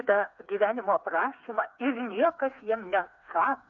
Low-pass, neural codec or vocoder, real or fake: 7.2 kHz; codec, 16 kHz, 4 kbps, FreqCodec, smaller model; fake